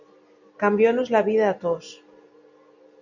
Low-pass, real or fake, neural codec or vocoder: 7.2 kHz; real; none